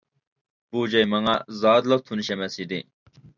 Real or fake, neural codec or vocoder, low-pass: real; none; 7.2 kHz